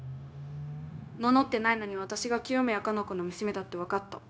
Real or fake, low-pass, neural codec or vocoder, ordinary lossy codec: fake; none; codec, 16 kHz, 0.9 kbps, LongCat-Audio-Codec; none